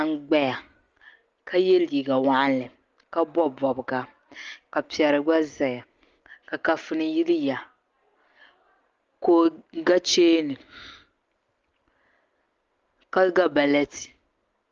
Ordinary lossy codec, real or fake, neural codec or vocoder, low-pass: Opus, 32 kbps; real; none; 7.2 kHz